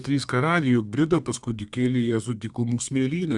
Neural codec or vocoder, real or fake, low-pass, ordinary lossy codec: codec, 32 kHz, 1.9 kbps, SNAC; fake; 10.8 kHz; Opus, 64 kbps